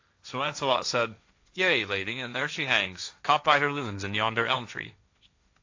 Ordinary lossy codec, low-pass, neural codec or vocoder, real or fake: AAC, 48 kbps; 7.2 kHz; codec, 16 kHz, 1.1 kbps, Voila-Tokenizer; fake